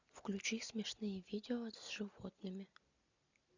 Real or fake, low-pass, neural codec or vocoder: real; 7.2 kHz; none